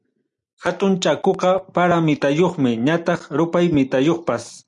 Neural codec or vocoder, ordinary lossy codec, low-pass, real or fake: none; MP3, 96 kbps; 10.8 kHz; real